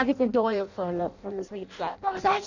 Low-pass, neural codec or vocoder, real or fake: 7.2 kHz; codec, 16 kHz in and 24 kHz out, 0.6 kbps, FireRedTTS-2 codec; fake